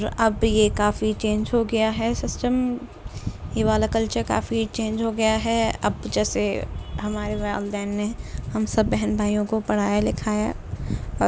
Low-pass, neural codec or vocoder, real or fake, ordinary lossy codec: none; none; real; none